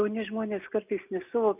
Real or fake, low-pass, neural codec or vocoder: real; 3.6 kHz; none